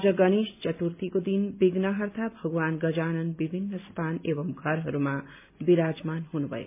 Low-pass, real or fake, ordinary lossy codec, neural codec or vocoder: 3.6 kHz; real; MP3, 32 kbps; none